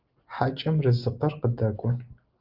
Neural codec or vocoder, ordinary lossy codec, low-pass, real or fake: none; Opus, 32 kbps; 5.4 kHz; real